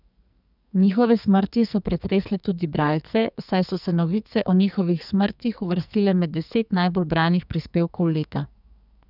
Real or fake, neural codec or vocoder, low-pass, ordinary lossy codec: fake; codec, 44.1 kHz, 2.6 kbps, SNAC; 5.4 kHz; none